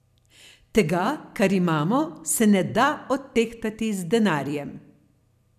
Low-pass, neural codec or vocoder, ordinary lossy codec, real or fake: 14.4 kHz; vocoder, 44.1 kHz, 128 mel bands every 256 samples, BigVGAN v2; none; fake